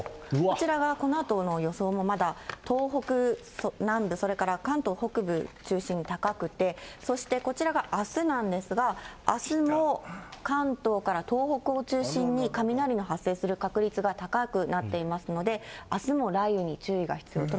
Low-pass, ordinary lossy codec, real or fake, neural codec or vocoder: none; none; real; none